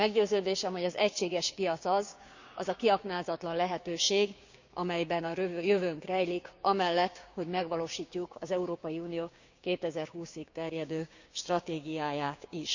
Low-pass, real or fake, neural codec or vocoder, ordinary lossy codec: 7.2 kHz; fake; codec, 16 kHz, 6 kbps, DAC; Opus, 64 kbps